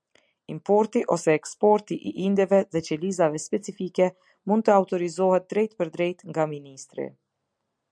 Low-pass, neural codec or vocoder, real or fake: 9.9 kHz; none; real